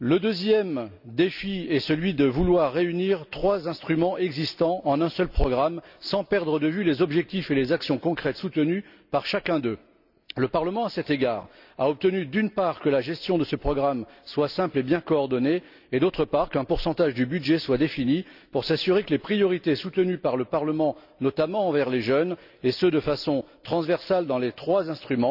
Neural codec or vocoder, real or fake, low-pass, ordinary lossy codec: none; real; 5.4 kHz; none